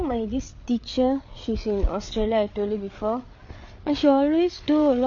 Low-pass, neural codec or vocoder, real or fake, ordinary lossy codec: 7.2 kHz; codec, 16 kHz, 8 kbps, FreqCodec, larger model; fake; none